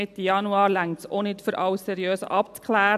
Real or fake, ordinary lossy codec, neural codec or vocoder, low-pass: real; AAC, 96 kbps; none; 14.4 kHz